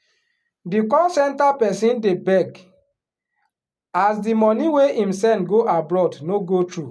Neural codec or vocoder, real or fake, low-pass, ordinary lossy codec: none; real; none; none